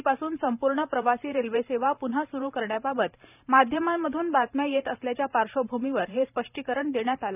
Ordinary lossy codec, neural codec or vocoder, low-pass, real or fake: none; vocoder, 44.1 kHz, 128 mel bands every 512 samples, BigVGAN v2; 3.6 kHz; fake